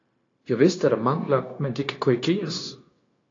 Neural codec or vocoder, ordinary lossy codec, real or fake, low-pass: codec, 16 kHz, 0.9 kbps, LongCat-Audio-Codec; AAC, 32 kbps; fake; 7.2 kHz